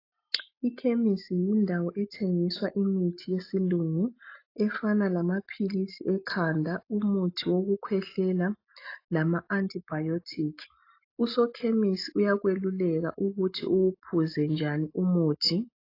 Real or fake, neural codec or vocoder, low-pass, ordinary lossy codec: real; none; 5.4 kHz; AAC, 32 kbps